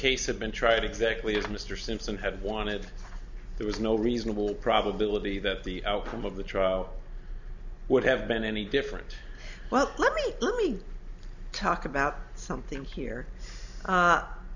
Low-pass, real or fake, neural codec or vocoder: 7.2 kHz; real; none